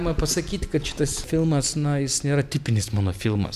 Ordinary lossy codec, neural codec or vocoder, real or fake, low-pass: MP3, 96 kbps; none; real; 14.4 kHz